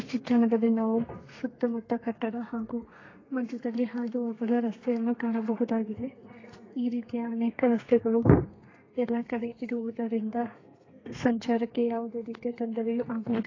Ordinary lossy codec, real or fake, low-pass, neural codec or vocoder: AAC, 32 kbps; fake; 7.2 kHz; codec, 32 kHz, 1.9 kbps, SNAC